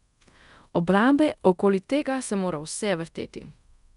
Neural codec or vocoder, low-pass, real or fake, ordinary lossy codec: codec, 24 kHz, 0.5 kbps, DualCodec; 10.8 kHz; fake; MP3, 96 kbps